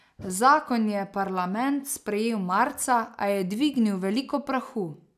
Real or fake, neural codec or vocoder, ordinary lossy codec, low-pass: real; none; none; 14.4 kHz